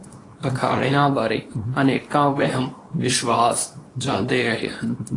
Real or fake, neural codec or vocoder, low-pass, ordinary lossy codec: fake; codec, 24 kHz, 0.9 kbps, WavTokenizer, small release; 10.8 kHz; AAC, 32 kbps